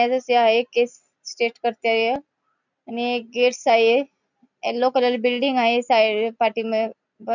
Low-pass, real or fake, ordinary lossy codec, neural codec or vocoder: 7.2 kHz; real; none; none